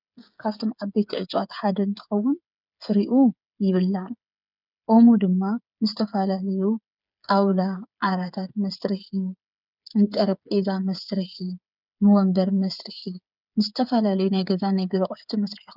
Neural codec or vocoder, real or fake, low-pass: codec, 16 kHz, 8 kbps, FreqCodec, smaller model; fake; 5.4 kHz